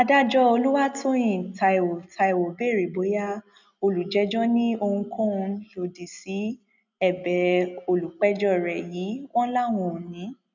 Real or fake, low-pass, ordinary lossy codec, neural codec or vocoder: real; 7.2 kHz; none; none